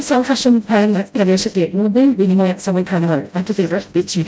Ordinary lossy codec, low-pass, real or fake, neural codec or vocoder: none; none; fake; codec, 16 kHz, 0.5 kbps, FreqCodec, smaller model